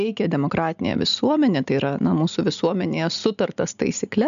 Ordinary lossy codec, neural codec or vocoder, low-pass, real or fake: MP3, 96 kbps; none; 7.2 kHz; real